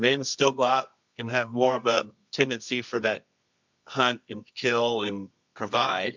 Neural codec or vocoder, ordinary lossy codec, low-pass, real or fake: codec, 24 kHz, 0.9 kbps, WavTokenizer, medium music audio release; MP3, 64 kbps; 7.2 kHz; fake